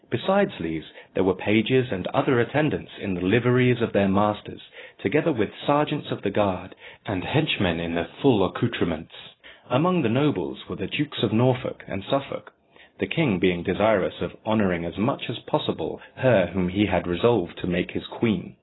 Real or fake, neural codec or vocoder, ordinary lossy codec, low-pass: real; none; AAC, 16 kbps; 7.2 kHz